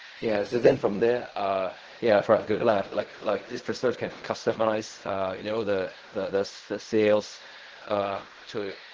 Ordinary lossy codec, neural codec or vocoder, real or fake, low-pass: Opus, 16 kbps; codec, 16 kHz in and 24 kHz out, 0.4 kbps, LongCat-Audio-Codec, fine tuned four codebook decoder; fake; 7.2 kHz